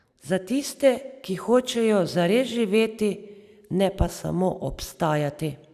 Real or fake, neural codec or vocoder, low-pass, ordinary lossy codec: fake; vocoder, 44.1 kHz, 128 mel bands every 256 samples, BigVGAN v2; 14.4 kHz; none